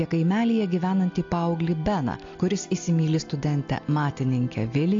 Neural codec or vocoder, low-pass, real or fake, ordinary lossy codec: none; 7.2 kHz; real; AAC, 48 kbps